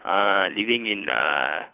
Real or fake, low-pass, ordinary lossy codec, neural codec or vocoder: fake; 3.6 kHz; none; codec, 24 kHz, 6 kbps, HILCodec